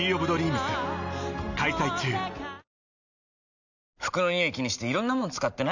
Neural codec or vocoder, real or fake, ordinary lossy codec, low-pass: none; real; none; 7.2 kHz